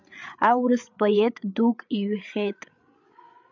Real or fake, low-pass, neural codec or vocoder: fake; 7.2 kHz; codec, 16 kHz, 8 kbps, FreqCodec, larger model